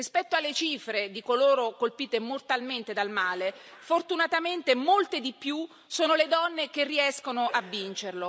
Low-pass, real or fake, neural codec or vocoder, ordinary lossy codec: none; real; none; none